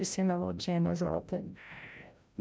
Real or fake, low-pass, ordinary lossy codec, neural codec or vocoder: fake; none; none; codec, 16 kHz, 0.5 kbps, FreqCodec, larger model